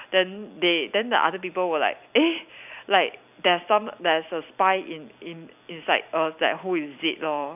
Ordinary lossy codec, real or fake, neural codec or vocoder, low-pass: none; fake; vocoder, 44.1 kHz, 128 mel bands every 256 samples, BigVGAN v2; 3.6 kHz